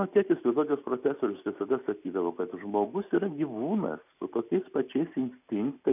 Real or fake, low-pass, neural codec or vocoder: real; 3.6 kHz; none